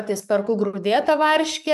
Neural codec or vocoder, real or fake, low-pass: codec, 44.1 kHz, 7.8 kbps, DAC; fake; 14.4 kHz